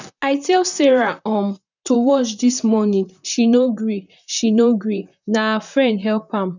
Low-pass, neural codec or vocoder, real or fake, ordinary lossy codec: 7.2 kHz; vocoder, 44.1 kHz, 128 mel bands, Pupu-Vocoder; fake; none